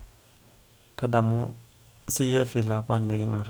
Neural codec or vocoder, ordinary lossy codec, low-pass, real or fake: codec, 44.1 kHz, 2.6 kbps, DAC; none; none; fake